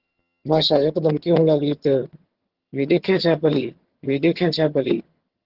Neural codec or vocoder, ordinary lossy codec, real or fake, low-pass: vocoder, 22.05 kHz, 80 mel bands, HiFi-GAN; Opus, 16 kbps; fake; 5.4 kHz